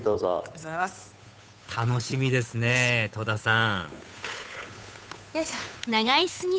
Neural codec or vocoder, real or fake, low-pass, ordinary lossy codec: codec, 16 kHz, 8 kbps, FunCodec, trained on Chinese and English, 25 frames a second; fake; none; none